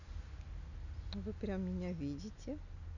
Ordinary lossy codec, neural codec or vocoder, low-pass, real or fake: none; none; 7.2 kHz; real